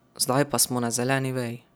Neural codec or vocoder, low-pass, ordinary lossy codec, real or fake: none; none; none; real